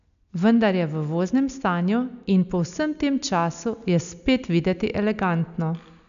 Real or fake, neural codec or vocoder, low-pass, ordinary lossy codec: real; none; 7.2 kHz; MP3, 96 kbps